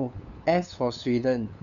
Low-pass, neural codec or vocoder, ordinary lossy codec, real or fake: 7.2 kHz; codec, 16 kHz, 8 kbps, FunCodec, trained on LibriTTS, 25 frames a second; none; fake